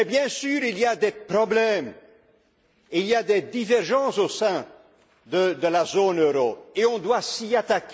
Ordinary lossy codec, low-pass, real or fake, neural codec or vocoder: none; none; real; none